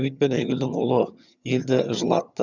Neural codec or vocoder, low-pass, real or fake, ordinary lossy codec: vocoder, 22.05 kHz, 80 mel bands, HiFi-GAN; 7.2 kHz; fake; none